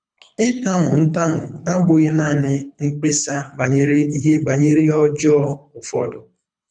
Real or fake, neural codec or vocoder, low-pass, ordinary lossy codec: fake; codec, 24 kHz, 3 kbps, HILCodec; 9.9 kHz; none